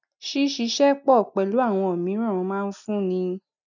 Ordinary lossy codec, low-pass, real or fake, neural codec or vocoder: none; 7.2 kHz; real; none